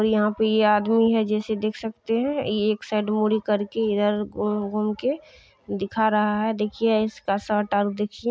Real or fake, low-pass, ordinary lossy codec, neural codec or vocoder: real; none; none; none